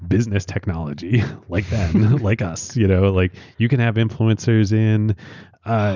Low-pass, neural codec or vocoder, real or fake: 7.2 kHz; none; real